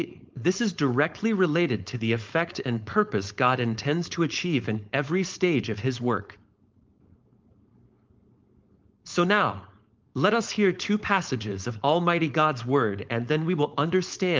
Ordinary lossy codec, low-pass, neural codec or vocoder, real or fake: Opus, 32 kbps; 7.2 kHz; codec, 16 kHz, 4.8 kbps, FACodec; fake